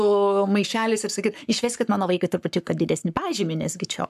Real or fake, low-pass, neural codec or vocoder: fake; 14.4 kHz; codec, 44.1 kHz, 7.8 kbps, Pupu-Codec